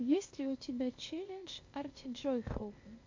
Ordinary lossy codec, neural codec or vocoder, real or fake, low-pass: MP3, 48 kbps; codec, 16 kHz, 0.8 kbps, ZipCodec; fake; 7.2 kHz